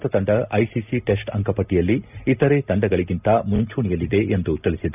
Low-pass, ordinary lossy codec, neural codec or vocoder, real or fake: 3.6 kHz; none; none; real